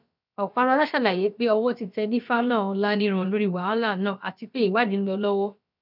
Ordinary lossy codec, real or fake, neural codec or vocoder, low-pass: none; fake; codec, 16 kHz, about 1 kbps, DyCAST, with the encoder's durations; 5.4 kHz